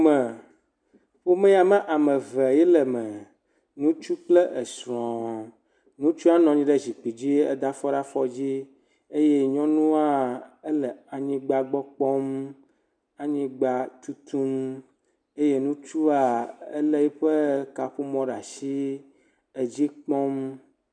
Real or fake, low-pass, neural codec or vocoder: real; 9.9 kHz; none